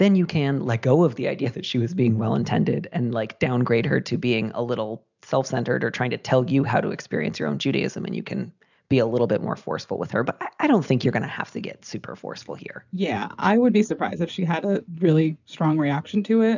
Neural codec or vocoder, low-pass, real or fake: none; 7.2 kHz; real